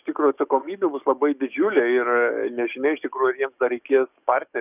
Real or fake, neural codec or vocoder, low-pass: fake; codec, 44.1 kHz, 7.8 kbps, DAC; 3.6 kHz